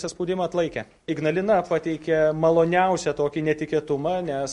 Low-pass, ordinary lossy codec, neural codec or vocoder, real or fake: 10.8 kHz; MP3, 48 kbps; none; real